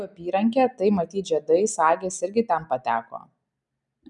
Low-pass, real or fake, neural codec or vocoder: 10.8 kHz; real; none